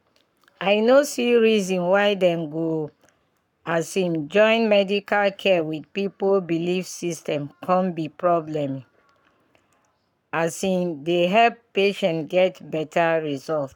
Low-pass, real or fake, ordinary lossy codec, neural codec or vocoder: 19.8 kHz; fake; none; codec, 44.1 kHz, 7.8 kbps, Pupu-Codec